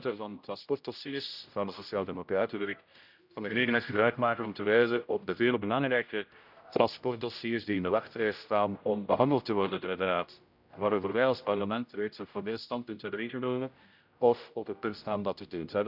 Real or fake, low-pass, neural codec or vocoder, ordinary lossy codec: fake; 5.4 kHz; codec, 16 kHz, 0.5 kbps, X-Codec, HuBERT features, trained on general audio; none